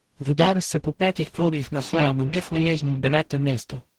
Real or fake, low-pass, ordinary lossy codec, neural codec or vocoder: fake; 19.8 kHz; Opus, 16 kbps; codec, 44.1 kHz, 0.9 kbps, DAC